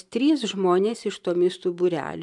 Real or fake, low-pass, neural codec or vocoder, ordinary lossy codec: real; 10.8 kHz; none; MP3, 96 kbps